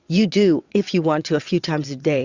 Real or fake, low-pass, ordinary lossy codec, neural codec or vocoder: fake; 7.2 kHz; Opus, 64 kbps; vocoder, 22.05 kHz, 80 mel bands, WaveNeXt